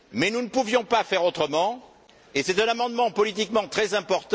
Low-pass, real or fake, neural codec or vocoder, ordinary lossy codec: none; real; none; none